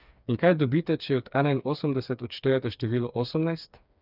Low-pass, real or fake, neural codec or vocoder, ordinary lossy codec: 5.4 kHz; fake; codec, 16 kHz, 4 kbps, FreqCodec, smaller model; none